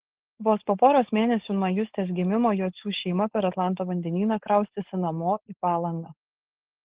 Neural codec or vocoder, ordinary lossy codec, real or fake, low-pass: codec, 16 kHz, 4.8 kbps, FACodec; Opus, 16 kbps; fake; 3.6 kHz